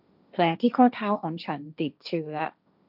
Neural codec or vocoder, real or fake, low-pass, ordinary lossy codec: codec, 16 kHz, 1.1 kbps, Voila-Tokenizer; fake; 5.4 kHz; none